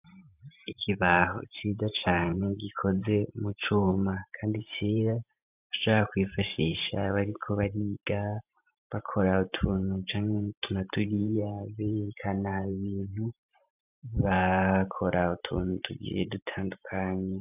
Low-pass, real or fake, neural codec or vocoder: 3.6 kHz; fake; vocoder, 44.1 kHz, 128 mel bands every 512 samples, BigVGAN v2